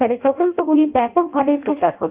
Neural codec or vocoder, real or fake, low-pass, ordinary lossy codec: codec, 16 kHz in and 24 kHz out, 0.6 kbps, FireRedTTS-2 codec; fake; 3.6 kHz; Opus, 32 kbps